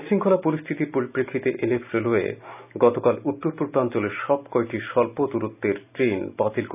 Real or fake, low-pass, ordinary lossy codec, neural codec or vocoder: real; 3.6 kHz; none; none